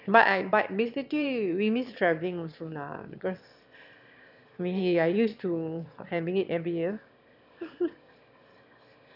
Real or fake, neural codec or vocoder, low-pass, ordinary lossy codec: fake; autoencoder, 22.05 kHz, a latent of 192 numbers a frame, VITS, trained on one speaker; 5.4 kHz; none